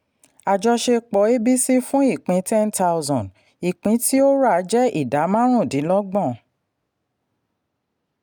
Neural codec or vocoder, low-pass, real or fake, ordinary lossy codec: none; none; real; none